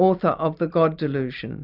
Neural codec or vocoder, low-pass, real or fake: none; 5.4 kHz; real